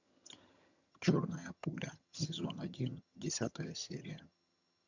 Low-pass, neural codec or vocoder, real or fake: 7.2 kHz; vocoder, 22.05 kHz, 80 mel bands, HiFi-GAN; fake